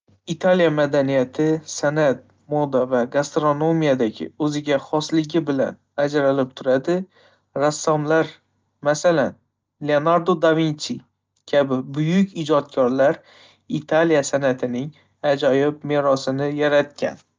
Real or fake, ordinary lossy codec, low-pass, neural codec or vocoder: real; Opus, 24 kbps; 7.2 kHz; none